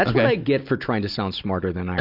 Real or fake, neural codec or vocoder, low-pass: real; none; 5.4 kHz